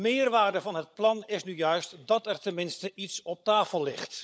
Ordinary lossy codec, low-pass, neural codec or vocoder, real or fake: none; none; codec, 16 kHz, 16 kbps, FunCodec, trained on LibriTTS, 50 frames a second; fake